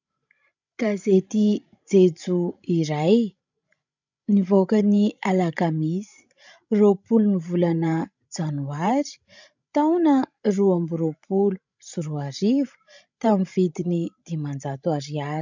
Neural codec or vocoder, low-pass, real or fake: codec, 16 kHz, 16 kbps, FreqCodec, larger model; 7.2 kHz; fake